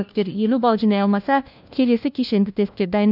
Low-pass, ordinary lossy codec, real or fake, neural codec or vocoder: 5.4 kHz; none; fake; codec, 16 kHz, 1 kbps, FunCodec, trained on LibriTTS, 50 frames a second